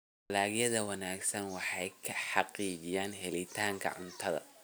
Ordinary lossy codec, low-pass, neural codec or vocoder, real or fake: none; none; none; real